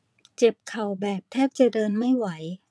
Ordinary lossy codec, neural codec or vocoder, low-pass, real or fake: none; vocoder, 22.05 kHz, 80 mel bands, WaveNeXt; none; fake